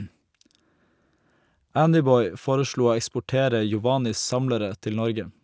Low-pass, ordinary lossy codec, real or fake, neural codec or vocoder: none; none; real; none